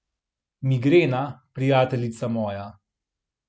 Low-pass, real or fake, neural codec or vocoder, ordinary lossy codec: none; real; none; none